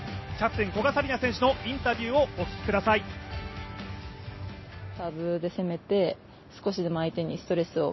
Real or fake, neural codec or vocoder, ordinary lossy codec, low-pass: real; none; MP3, 24 kbps; 7.2 kHz